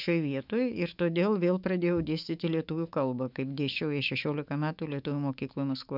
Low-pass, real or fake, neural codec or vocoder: 5.4 kHz; fake; autoencoder, 48 kHz, 128 numbers a frame, DAC-VAE, trained on Japanese speech